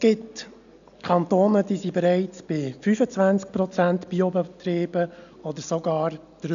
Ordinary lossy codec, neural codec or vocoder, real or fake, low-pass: none; none; real; 7.2 kHz